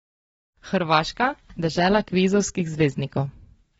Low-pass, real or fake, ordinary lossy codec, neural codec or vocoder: 19.8 kHz; real; AAC, 24 kbps; none